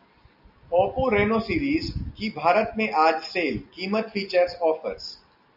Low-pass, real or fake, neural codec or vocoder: 5.4 kHz; real; none